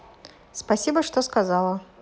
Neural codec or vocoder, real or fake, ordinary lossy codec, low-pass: none; real; none; none